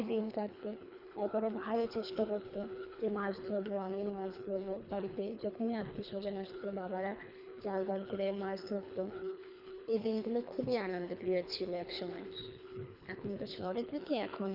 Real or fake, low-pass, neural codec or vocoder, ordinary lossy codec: fake; 5.4 kHz; codec, 24 kHz, 3 kbps, HILCodec; none